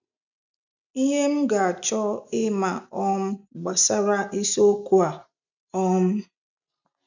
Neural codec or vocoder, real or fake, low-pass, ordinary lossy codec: none; real; 7.2 kHz; none